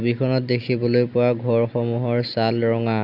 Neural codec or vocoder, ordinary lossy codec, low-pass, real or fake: none; none; 5.4 kHz; real